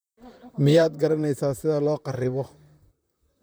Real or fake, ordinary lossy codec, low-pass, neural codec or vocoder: fake; none; none; vocoder, 44.1 kHz, 128 mel bands, Pupu-Vocoder